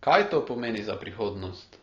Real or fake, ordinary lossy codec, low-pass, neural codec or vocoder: real; AAC, 32 kbps; 7.2 kHz; none